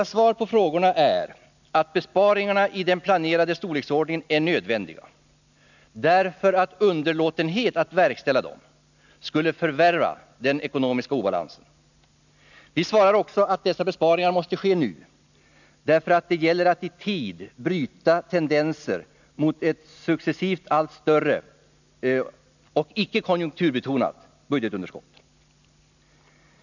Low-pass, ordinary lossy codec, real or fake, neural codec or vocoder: 7.2 kHz; none; real; none